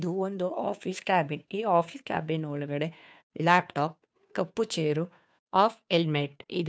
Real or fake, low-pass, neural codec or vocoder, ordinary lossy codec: fake; none; codec, 16 kHz, 2 kbps, FunCodec, trained on Chinese and English, 25 frames a second; none